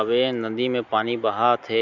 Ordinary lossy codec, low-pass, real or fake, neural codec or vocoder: none; 7.2 kHz; real; none